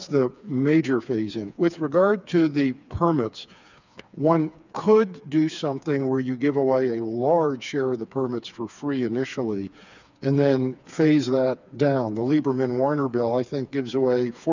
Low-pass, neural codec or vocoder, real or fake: 7.2 kHz; codec, 16 kHz, 4 kbps, FreqCodec, smaller model; fake